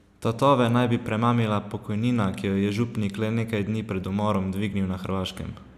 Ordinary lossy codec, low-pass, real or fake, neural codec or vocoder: none; 14.4 kHz; real; none